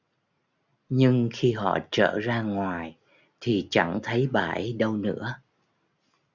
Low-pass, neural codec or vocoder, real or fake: 7.2 kHz; none; real